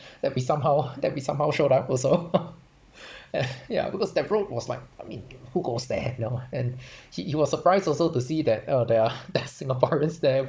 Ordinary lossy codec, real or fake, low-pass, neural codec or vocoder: none; fake; none; codec, 16 kHz, 16 kbps, FunCodec, trained on Chinese and English, 50 frames a second